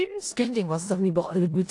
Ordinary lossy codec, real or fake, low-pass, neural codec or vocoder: AAC, 48 kbps; fake; 10.8 kHz; codec, 16 kHz in and 24 kHz out, 0.4 kbps, LongCat-Audio-Codec, four codebook decoder